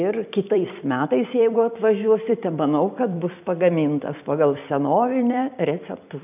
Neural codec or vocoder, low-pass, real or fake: vocoder, 44.1 kHz, 128 mel bands every 256 samples, BigVGAN v2; 3.6 kHz; fake